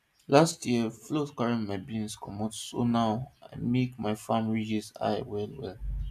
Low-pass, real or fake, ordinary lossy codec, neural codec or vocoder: 14.4 kHz; real; none; none